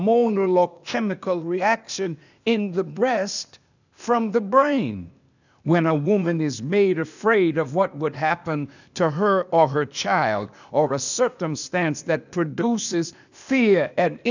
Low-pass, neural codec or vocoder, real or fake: 7.2 kHz; codec, 16 kHz, 0.8 kbps, ZipCodec; fake